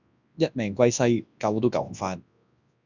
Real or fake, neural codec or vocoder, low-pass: fake; codec, 24 kHz, 0.9 kbps, WavTokenizer, large speech release; 7.2 kHz